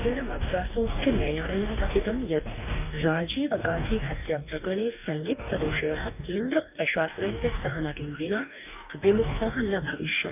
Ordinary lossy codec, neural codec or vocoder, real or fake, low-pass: none; codec, 44.1 kHz, 2.6 kbps, DAC; fake; 3.6 kHz